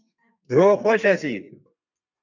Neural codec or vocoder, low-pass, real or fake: codec, 32 kHz, 1.9 kbps, SNAC; 7.2 kHz; fake